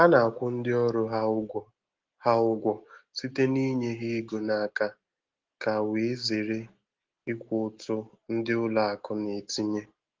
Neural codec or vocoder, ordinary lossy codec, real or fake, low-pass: none; Opus, 16 kbps; real; 7.2 kHz